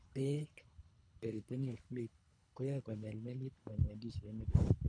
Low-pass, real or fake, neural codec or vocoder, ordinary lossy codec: 9.9 kHz; fake; codec, 24 kHz, 3 kbps, HILCodec; none